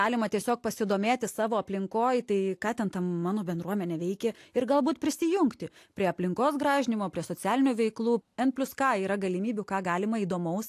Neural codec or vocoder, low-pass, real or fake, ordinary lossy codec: none; 14.4 kHz; real; AAC, 64 kbps